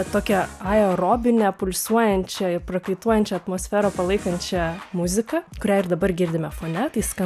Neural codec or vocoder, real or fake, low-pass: none; real; 14.4 kHz